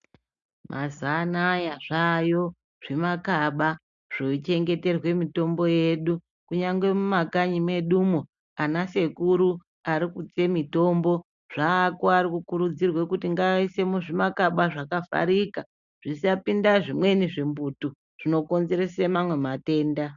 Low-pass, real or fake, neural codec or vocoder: 7.2 kHz; real; none